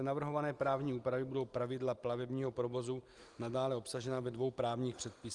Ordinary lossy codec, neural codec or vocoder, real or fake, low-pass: Opus, 24 kbps; none; real; 10.8 kHz